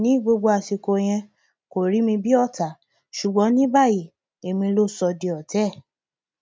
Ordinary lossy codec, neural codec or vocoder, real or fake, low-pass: none; none; real; none